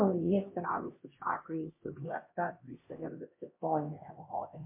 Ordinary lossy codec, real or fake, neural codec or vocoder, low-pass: MP3, 24 kbps; fake; codec, 16 kHz, 1 kbps, X-Codec, HuBERT features, trained on LibriSpeech; 3.6 kHz